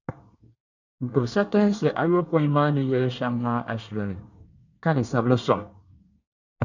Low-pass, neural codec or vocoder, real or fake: 7.2 kHz; codec, 24 kHz, 1 kbps, SNAC; fake